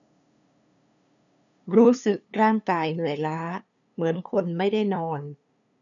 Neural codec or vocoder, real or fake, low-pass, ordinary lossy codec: codec, 16 kHz, 2 kbps, FunCodec, trained on LibriTTS, 25 frames a second; fake; 7.2 kHz; none